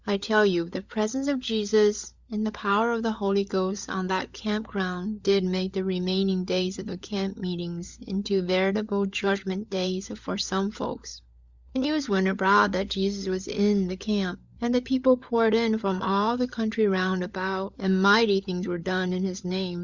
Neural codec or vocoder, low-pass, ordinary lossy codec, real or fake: codec, 16 kHz, 16 kbps, FunCodec, trained on LibriTTS, 50 frames a second; 7.2 kHz; Opus, 64 kbps; fake